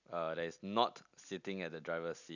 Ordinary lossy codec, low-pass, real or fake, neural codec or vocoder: none; 7.2 kHz; real; none